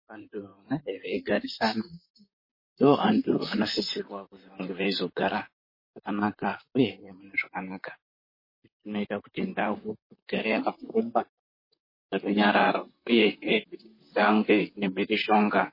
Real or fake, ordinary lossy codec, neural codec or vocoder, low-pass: fake; MP3, 24 kbps; vocoder, 44.1 kHz, 128 mel bands, Pupu-Vocoder; 5.4 kHz